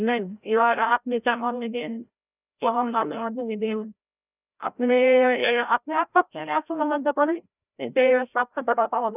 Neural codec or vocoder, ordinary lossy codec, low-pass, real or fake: codec, 16 kHz, 0.5 kbps, FreqCodec, larger model; none; 3.6 kHz; fake